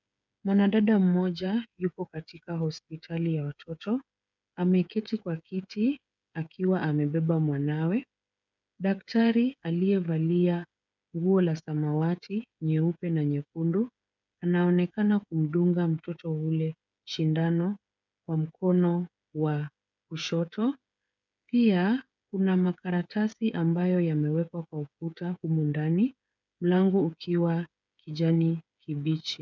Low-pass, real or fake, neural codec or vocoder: 7.2 kHz; fake; codec, 16 kHz, 8 kbps, FreqCodec, smaller model